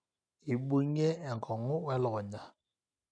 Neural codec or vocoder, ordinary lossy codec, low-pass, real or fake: none; none; 9.9 kHz; real